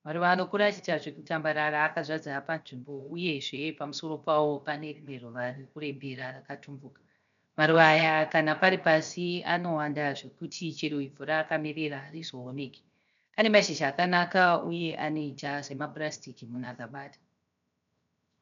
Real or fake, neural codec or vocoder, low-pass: fake; codec, 16 kHz, 0.7 kbps, FocalCodec; 7.2 kHz